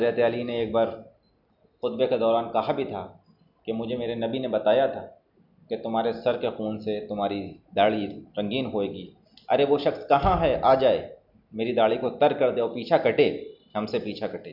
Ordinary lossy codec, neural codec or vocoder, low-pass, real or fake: none; none; 5.4 kHz; real